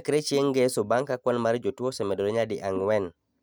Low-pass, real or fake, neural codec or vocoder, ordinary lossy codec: none; real; none; none